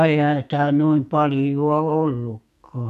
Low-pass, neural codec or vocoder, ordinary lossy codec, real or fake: 14.4 kHz; codec, 44.1 kHz, 2.6 kbps, SNAC; none; fake